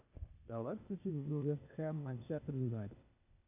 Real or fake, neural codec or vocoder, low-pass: fake; codec, 16 kHz, 0.8 kbps, ZipCodec; 3.6 kHz